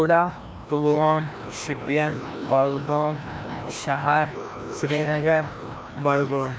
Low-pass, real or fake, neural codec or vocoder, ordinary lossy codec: none; fake; codec, 16 kHz, 1 kbps, FreqCodec, larger model; none